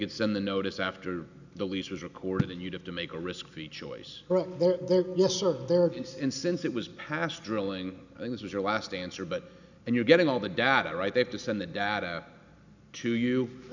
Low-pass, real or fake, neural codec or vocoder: 7.2 kHz; real; none